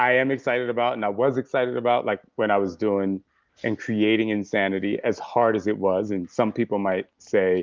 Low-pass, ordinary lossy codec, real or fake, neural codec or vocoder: 7.2 kHz; Opus, 24 kbps; real; none